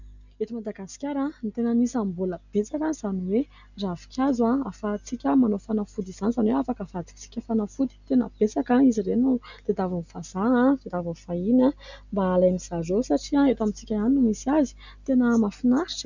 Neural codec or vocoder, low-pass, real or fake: none; 7.2 kHz; real